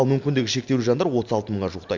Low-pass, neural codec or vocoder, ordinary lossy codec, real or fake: 7.2 kHz; none; MP3, 64 kbps; real